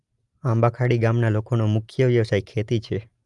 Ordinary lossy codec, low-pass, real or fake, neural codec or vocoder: Opus, 32 kbps; 10.8 kHz; real; none